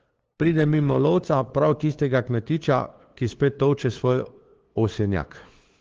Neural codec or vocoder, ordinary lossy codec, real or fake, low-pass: codec, 16 kHz, 2 kbps, FunCodec, trained on LibriTTS, 25 frames a second; Opus, 16 kbps; fake; 7.2 kHz